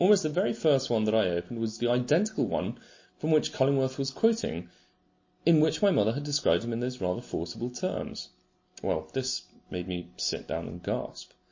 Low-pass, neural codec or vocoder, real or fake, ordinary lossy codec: 7.2 kHz; none; real; MP3, 32 kbps